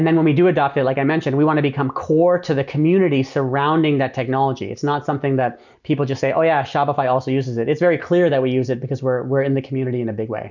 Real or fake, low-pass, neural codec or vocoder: real; 7.2 kHz; none